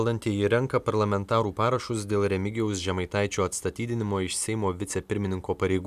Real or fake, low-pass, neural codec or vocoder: real; 14.4 kHz; none